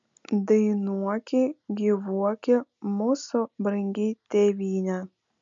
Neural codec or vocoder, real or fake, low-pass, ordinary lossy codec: none; real; 7.2 kHz; MP3, 96 kbps